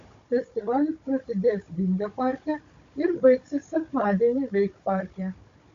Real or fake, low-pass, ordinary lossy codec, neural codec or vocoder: fake; 7.2 kHz; AAC, 48 kbps; codec, 16 kHz, 16 kbps, FunCodec, trained on Chinese and English, 50 frames a second